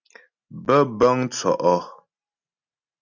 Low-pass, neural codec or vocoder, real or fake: 7.2 kHz; none; real